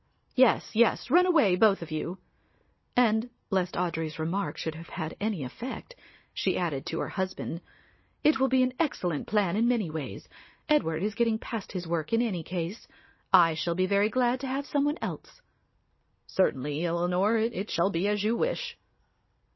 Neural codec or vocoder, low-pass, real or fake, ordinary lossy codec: none; 7.2 kHz; real; MP3, 24 kbps